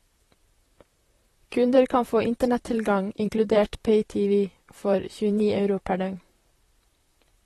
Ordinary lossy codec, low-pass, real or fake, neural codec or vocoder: AAC, 32 kbps; 19.8 kHz; fake; vocoder, 44.1 kHz, 128 mel bands, Pupu-Vocoder